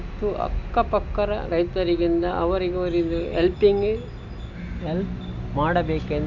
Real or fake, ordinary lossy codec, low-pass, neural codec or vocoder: real; none; 7.2 kHz; none